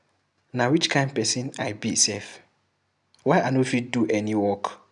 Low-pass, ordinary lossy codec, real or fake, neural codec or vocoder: 10.8 kHz; none; real; none